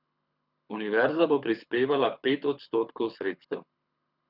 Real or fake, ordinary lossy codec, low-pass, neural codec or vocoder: fake; none; 5.4 kHz; codec, 24 kHz, 6 kbps, HILCodec